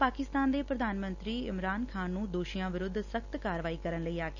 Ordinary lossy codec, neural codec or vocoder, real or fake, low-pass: none; none; real; 7.2 kHz